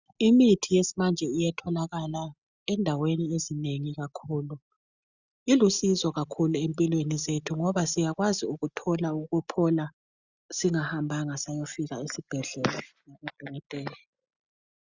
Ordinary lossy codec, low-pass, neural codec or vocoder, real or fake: Opus, 64 kbps; 7.2 kHz; none; real